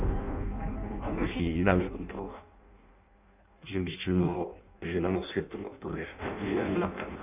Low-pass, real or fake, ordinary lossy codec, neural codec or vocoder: 3.6 kHz; fake; none; codec, 16 kHz in and 24 kHz out, 0.6 kbps, FireRedTTS-2 codec